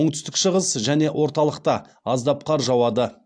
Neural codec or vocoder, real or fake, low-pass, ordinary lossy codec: none; real; 9.9 kHz; AAC, 64 kbps